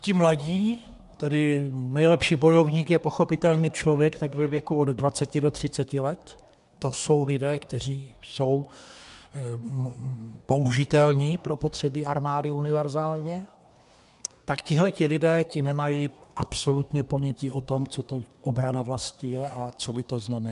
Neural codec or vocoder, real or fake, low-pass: codec, 24 kHz, 1 kbps, SNAC; fake; 10.8 kHz